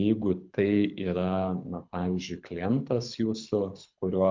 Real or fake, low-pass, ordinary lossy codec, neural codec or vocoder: fake; 7.2 kHz; MP3, 64 kbps; codec, 24 kHz, 6 kbps, HILCodec